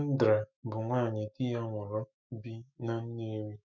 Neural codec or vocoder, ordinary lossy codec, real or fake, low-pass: autoencoder, 48 kHz, 128 numbers a frame, DAC-VAE, trained on Japanese speech; none; fake; 7.2 kHz